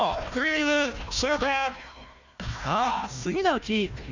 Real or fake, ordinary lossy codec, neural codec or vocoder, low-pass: fake; Opus, 64 kbps; codec, 16 kHz, 1 kbps, FunCodec, trained on Chinese and English, 50 frames a second; 7.2 kHz